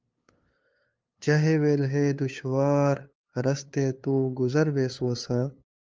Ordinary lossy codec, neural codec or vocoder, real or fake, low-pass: Opus, 24 kbps; codec, 16 kHz, 2 kbps, FunCodec, trained on LibriTTS, 25 frames a second; fake; 7.2 kHz